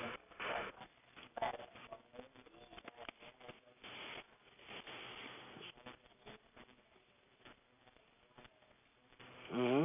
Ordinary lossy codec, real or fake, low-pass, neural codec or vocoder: none; real; 3.6 kHz; none